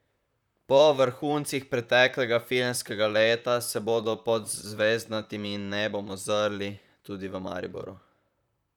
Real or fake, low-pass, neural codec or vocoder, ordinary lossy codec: fake; 19.8 kHz; vocoder, 44.1 kHz, 128 mel bands, Pupu-Vocoder; none